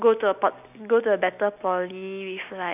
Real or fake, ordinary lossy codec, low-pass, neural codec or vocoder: real; none; 3.6 kHz; none